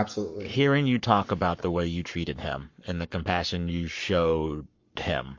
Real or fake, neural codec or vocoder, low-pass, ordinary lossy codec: fake; codec, 44.1 kHz, 7.8 kbps, Pupu-Codec; 7.2 kHz; MP3, 48 kbps